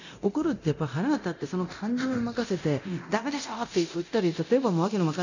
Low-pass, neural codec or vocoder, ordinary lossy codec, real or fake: 7.2 kHz; codec, 24 kHz, 0.9 kbps, DualCodec; none; fake